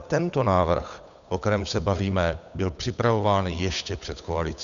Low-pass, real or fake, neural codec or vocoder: 7.2 kHz; fake; codec, 16 kHz, 8 kbps, FunCodec, trained on Chinese and English, 25 frames a second